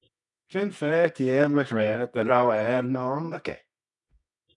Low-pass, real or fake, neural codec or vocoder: 10.8 kHz; fake; codec, 24 kHz, 0.9 kbps, WavTokenizer, medium music audio release